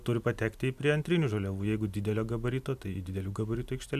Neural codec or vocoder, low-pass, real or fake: none; 14.4 kHz; real